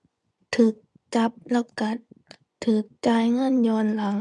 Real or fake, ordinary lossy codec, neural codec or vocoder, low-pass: fake; none; vocoder, 24 kHz, 100 mel bands, Vocos; 10.8 kHz